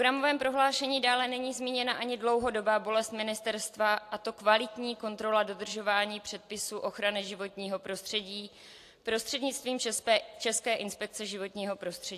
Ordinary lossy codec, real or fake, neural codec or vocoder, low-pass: AAC, 64 kbps; real; none; 14.4 kHz